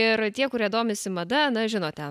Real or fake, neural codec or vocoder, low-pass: real; none; 14.4 kHz